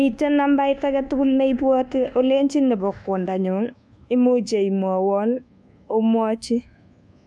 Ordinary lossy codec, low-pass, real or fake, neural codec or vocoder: none; none; fake; codec, 24 kHz, 1.2 kbps, DualCodec